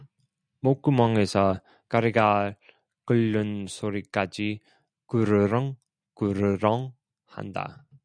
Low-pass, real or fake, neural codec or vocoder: 9.9 kHz; real; none